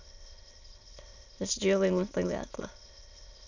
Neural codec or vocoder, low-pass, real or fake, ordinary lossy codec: autoencoder, 22.05 kHz, a latent of 192 numbers a frame, VITS, trained on many speakers; 7.2 kHz; fake; none